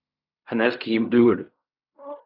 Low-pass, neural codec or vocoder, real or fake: 5.4 kHz; codec, 16 kHz in and 24 kHz out, 0.4 kbps, LongCat-Audio-Codec, fine tuned four codebook decoder; fake